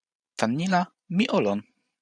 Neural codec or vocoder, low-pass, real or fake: vocoder, 44.1 kHz, 128 mel bands every 512 samples, BigVGAN v2; 9.9 kHz; fake